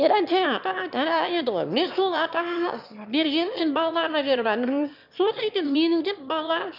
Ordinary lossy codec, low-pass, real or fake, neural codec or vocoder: none; 5.4 kHz; fake; autoencoder, 22.05 kHz, a latent of 192 numbers a frame, VITS, trained on one speaker